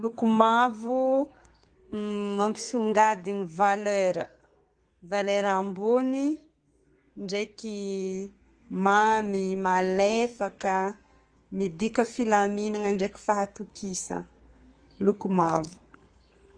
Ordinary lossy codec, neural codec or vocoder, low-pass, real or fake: Opus, 24 kbps; codec, 32 kHz, 1.9 kbps, SNAC; 9.9 kHz; fake